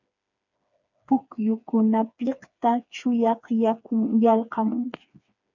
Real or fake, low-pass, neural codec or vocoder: fake; 7.2 kHz; codec, 16 kHz, 4 kbps, FreqCodec, smaller model